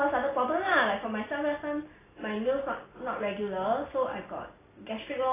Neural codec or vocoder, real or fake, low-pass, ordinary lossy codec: none; real; 3.6 kHz; AAC, 16 kbps